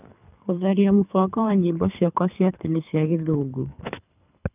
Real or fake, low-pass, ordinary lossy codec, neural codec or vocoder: fake; 3.6 kHz; none; codec, 24 kHz, 3 kbps, HILCodec